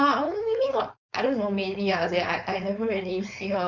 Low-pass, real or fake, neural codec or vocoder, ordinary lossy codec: 7.2 kHz; fake; codec, 16 kHz, 4.8 kbps, FACodec; none